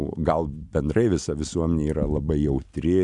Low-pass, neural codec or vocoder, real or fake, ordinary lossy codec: 10.8 kHz; none; real; MP3, 96 kbps